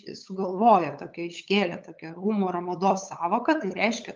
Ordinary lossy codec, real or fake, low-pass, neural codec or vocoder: Opus, 24 kbps; fake; 7.2 kHz; codec, 16 kHz, 8 kbps, FunCodec, trained on LibriTTS, 25 frames a second